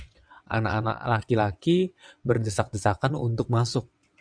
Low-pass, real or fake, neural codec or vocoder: 9.9 kHz; fake; vocoder, 22.05 kHz, 80 mel bands, WaveNeXt